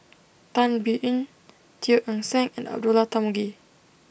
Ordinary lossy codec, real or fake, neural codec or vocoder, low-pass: none; real; none; none